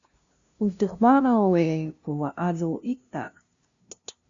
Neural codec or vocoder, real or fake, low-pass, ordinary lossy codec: codec, 16 kHz, 0.5 kbps, FunCodec, trained on LibriTTS, 25 frames a second; fake; 7.2 kHz; Opus, 64 kbps